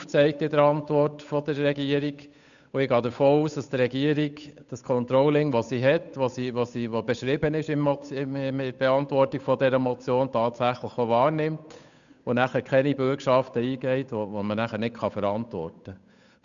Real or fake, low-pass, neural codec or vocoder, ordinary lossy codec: fake; 7.2 kHz; codec, 16 kHz, 8 kbps, FunCodec, trained on Chinese and English, 25 frames a second; none